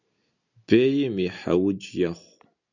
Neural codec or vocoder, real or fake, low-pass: none; real; 7.2 kHz